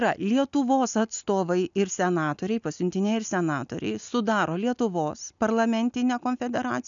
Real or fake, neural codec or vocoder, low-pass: real; none; 7.2 kHz